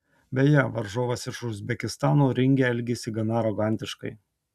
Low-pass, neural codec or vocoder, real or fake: 14.4 kHz; none; real